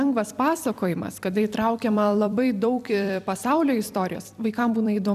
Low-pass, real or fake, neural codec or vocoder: 14.4 kHz; fake; vocoder, 44.1 kHz, 128 mel bands every 256 samples, BigVGAN v2